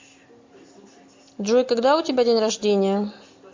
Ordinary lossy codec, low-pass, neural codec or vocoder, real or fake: MP3, 48 kbps; 7.2 kHz; none; real